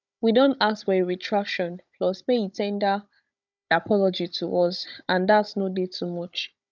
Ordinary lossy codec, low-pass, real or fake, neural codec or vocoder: none; 7.2 kHz; fake; codec, 16 kHz, 16 kbps, FunCodec, trained on Chinese and English, 50 frames a second